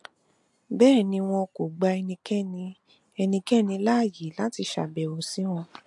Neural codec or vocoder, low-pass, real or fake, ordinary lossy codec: none; 10.8 kHz; real; MP3, 64 kbps